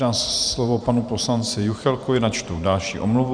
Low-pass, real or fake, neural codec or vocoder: 9.9 kHz; fake; vocoder, 44.1 kHz, 128 mel bands every 512 samples, BigVGAN v2